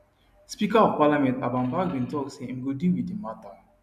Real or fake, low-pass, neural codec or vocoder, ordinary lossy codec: real; 14.4 kHz; none; none